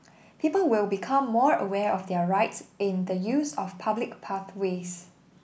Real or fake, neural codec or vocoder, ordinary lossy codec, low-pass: real; none; none; none